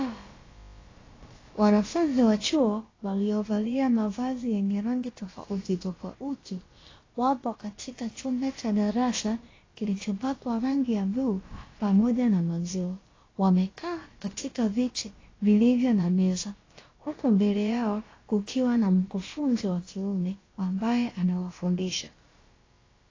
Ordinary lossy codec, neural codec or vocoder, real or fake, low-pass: AAC, 32 kbps; codec, 16 kHz, about 1 kbps, DyCAST, with the encoder's durations; fake; 7.2 kHz